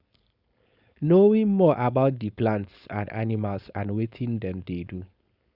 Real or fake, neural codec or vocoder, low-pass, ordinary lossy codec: fake; codec, 16 kHz, 4.8 kbps, FACodec; 5.4 kHz; Opus, 64 kbps